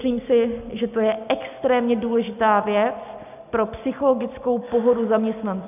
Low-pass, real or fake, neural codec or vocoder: 3.6 kHz; real; none